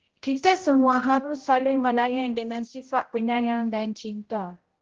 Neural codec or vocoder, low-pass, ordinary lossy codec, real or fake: codec, 16 kHz, 0.5 kbps, X-Codec, HuBERT features, trained on general audio; 7.2 kHz; Opus, 16 kbps; fake